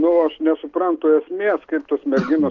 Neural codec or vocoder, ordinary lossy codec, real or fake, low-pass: none; Opus, 32 kbps; real; 7.2 kHz